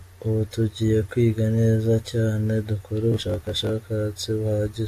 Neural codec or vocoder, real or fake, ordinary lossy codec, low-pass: none; real; AAC, 64 kbps; 14.4 kHz